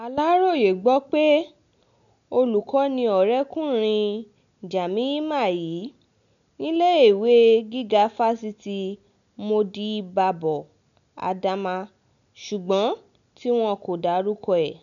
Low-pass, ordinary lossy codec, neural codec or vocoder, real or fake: 7.2 kHz; none; none; real